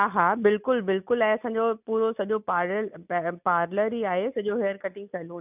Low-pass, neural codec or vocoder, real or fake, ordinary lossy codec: 3.6 kHz; none; real; none